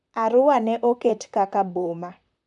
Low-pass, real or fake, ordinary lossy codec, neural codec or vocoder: 10.8 kHz; fake; none; vocoder, 44.1 kHz, 128 mel bands, Pupu-Vocoder